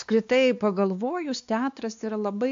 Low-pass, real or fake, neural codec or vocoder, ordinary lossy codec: 7.2 kHz; fake; codec, 16 kHz, 2 kbps, X-Codec, WavLM features, trained on Multilingual LibriSpeech; AAC, 64 kbps